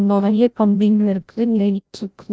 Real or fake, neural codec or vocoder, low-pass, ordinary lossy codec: fake; codec, 16 kHz, 0.5 kbps, FreqCodec, larger model; none; none